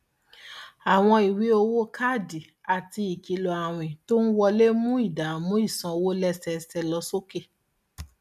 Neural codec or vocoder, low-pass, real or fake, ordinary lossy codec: none; 14.4 kHz; real; none